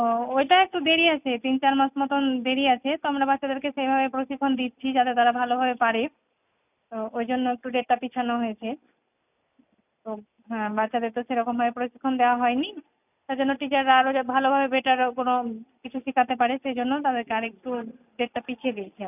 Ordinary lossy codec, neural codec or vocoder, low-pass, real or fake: none; none; 3.6 kHz; real